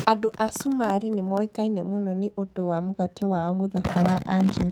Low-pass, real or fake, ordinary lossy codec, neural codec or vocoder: none; fake; none; codec, 44.1 kHz, 2.6 kbps, SNAC